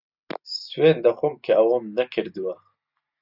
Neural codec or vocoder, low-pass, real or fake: none; 5.4 kHz; real